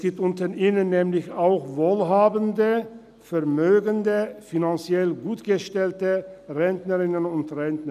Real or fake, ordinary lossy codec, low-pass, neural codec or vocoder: real; none; 14.4 kHz; none